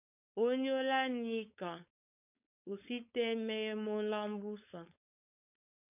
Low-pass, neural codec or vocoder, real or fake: 3.6 kHz; codec, 16 kHz, 4.8 kbps, FACodec; fake